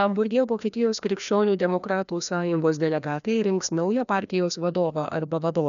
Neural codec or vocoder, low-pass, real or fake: codec, 16 kHz, 1 kbps, FreqCodec, larger model; 7.2 kHz; fake